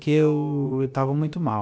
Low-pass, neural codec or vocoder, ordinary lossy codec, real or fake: none; codec, 16 kHz, about 1 kbps, DyCAST, with the encoder's durations; none; fake